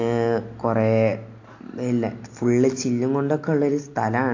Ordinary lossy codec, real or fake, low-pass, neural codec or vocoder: AAC, 48 kbps; real; 7.2 kHz; none